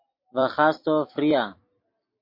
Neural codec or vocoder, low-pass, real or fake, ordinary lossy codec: vocoder, 44.1 kHz, 128 mel bands every 256 samples, BigVGAN v2; 5.4 kHz; fake; MP3, 32 kbps